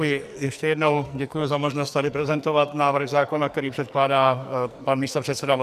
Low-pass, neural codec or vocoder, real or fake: 14.4 kHz; codec, 44.1 kHz, 2.6 kbps, SNAC; fake